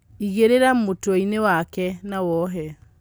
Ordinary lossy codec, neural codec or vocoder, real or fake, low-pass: none; none; real; none